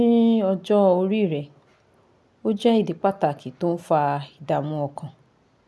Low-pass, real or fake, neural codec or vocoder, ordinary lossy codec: none; real; none; none